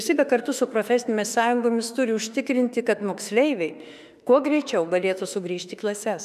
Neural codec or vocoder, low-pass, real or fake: autoencoder, 48 kHz, 32 numbers a frame, DAC-VAE, trained on Japanese speech; 14.4 kHz; fake